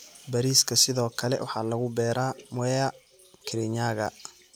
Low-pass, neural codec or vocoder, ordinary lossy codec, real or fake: none; none; none; real